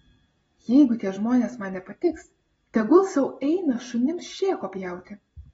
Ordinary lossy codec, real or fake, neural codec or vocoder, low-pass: AAC, 24 kbps; real; none; 10.8 kHz